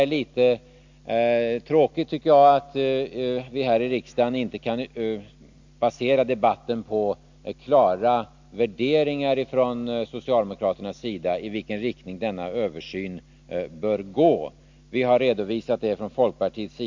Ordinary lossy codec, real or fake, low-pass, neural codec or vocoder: MP3, 64 kbps; real; 7.2 kHz; none